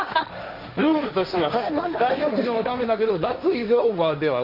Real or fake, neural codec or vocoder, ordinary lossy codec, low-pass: fake; codec, 16 kHz, 1.1 kbps, Voila-Tokenizer; none; 5.4 kHz